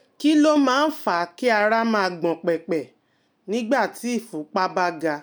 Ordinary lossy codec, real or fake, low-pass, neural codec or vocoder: none; real; none; none